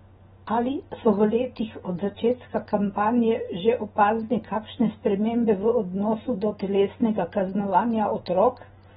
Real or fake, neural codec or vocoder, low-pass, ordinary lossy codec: real; none; 19.8 kHz; AAC, 16 kbps